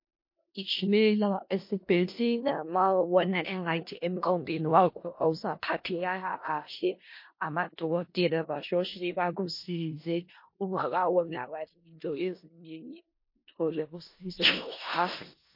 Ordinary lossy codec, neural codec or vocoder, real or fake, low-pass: MP3, 32 kbps; codec, 16 kHz in and 24 kHz out, 0.4 kbps, LongCat-Audio-Codec, four codebook decoder; fake; 5.4 kHz